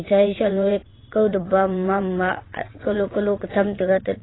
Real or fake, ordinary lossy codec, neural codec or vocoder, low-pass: fake; AAC, 16 kbps; vocoder, 22.05 kHz, 80 mel bands, WaveNeXt; 7.2 kHz